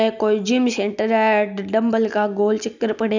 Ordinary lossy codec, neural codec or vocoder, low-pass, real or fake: none; none; 7.2 kHz; real